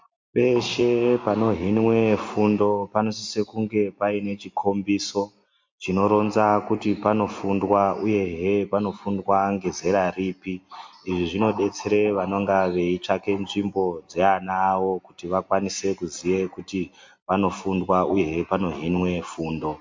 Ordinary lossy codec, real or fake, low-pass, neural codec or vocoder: MP3, 48 kbps; real; 7.2 kHz; none